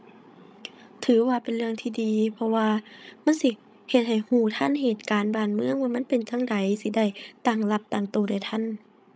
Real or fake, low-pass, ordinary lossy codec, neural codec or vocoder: fake; none; none; codec, 16 kHz, 16 kbps, FreqCodec, larger model